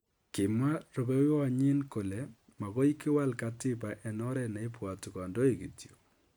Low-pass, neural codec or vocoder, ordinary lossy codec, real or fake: none; none; none; real